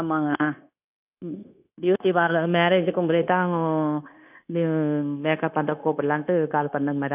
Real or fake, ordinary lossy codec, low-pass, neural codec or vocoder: fake; none; 3.6 kHz; codec, 16 kHz, 0.9 kbps, LongCat-Audio-Codec